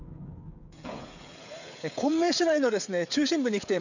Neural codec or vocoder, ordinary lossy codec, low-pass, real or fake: codec, 16 kHz, 16 kbps, FreqCodec, smaller model; none; 7.2 kHz; fake